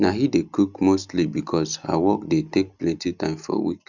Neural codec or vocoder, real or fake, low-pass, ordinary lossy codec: none; real; 7.2 kHz; none